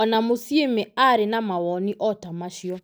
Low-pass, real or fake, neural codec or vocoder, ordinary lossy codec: none; real; none; none